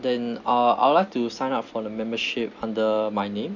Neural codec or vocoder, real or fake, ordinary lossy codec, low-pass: none; real; none; 7.2 kHz